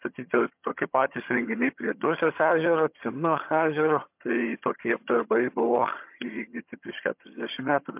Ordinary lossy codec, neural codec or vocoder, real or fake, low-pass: MP3, 32 kbps; vocoder, 22.05 kHz, 80 mel bands, HiFi-GAN; fake; 3.6 kHz